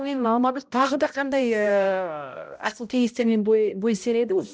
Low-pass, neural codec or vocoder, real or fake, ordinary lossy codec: none; codec, 16 kHz, 0.5 kbps, X-Codec, HuBERT features, trained on balanced general audio; fake; none